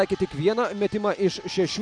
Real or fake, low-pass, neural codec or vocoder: real; 9.9 kHz; none